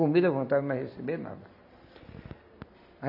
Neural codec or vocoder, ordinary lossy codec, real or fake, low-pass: none; none; real; 5.4 kHz